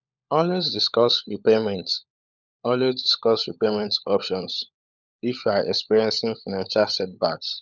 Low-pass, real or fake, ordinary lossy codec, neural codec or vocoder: 7.2 kHz; fake; none; codec, 16 kHz, 16 kbps, FunCodec, trained on LibriTTS, 50 frames a second